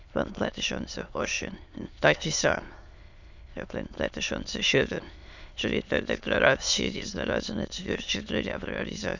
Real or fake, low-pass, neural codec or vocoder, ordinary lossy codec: fake; 7.2 kHz; autoencoder, 22.05 kHz, a latent of 192 numbers a frame, VITS, trained on many speakers; none